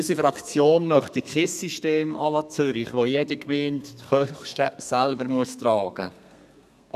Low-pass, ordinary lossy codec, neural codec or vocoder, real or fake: 14.4 kHz; none; codec, 32 kHz, 1.9 kbps, SNAC; fake